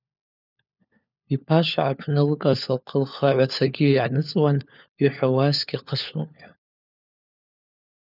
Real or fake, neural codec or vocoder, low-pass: fake; codec, 16 kHz, 4 kbps, FunCodec, trained on LibriTTS, 50 frames a second; 5.4 kHz